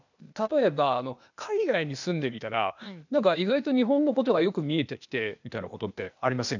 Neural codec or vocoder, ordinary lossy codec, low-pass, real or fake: codec, 16 kHz, 0.8 kbps, ZipCodec; none; 7.2 kHz; fake